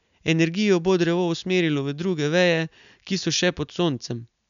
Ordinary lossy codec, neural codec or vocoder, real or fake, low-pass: none; none; real; 7.2 kHz